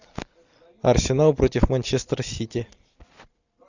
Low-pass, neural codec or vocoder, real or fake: 7.2 kHz; none; real